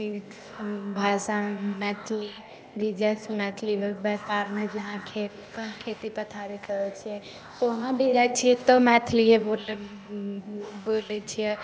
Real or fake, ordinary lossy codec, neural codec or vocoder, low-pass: fake; none; codec, 16 kHz, 0.8 kbps, ZipCodec; none